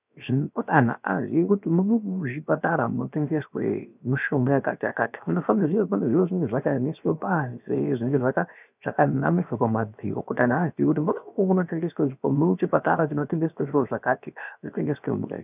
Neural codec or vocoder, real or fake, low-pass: codec, 16 kHz, 0.7 kbps, FocalCodec; fake; 3.6 kHz